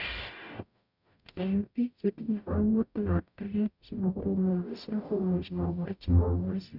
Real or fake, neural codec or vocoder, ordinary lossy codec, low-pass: fake; codec, 44.1 kHz, 0.9 kbps, DAC; none; 5.4 kHz